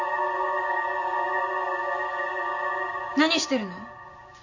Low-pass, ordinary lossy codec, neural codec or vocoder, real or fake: 7.2 kHz; none; none; real